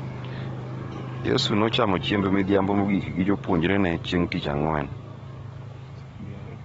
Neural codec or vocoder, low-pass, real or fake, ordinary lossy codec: codec, 44.1 kHz, 7.8 kbps, DAC; 19.8 kHz; fake; AAC, 24 kbps